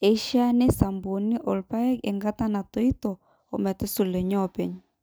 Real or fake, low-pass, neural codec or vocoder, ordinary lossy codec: real; none; none; none